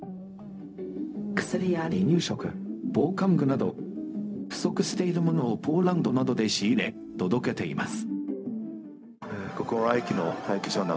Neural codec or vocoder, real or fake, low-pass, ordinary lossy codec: codec, 16 kHz, 0.4 kbps, LongCat-Audio-Codec; fake; none; none